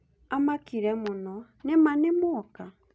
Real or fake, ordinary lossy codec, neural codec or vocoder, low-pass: real; none; none; none